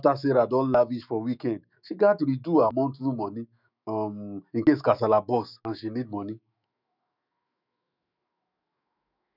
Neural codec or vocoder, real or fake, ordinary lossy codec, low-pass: autoencoder, 48 kHz, 128 numbers a frame, DAC-VAE, trained on Japanese speech; fake; none; 5.4 kHz